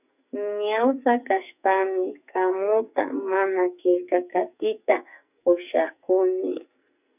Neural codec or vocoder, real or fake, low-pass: codec, 44.1 kHz, 2.6 kbps, SNAC; fake; 3.6 kHz